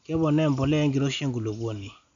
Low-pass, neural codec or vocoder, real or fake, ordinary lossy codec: 7.2 kHz; none; real; none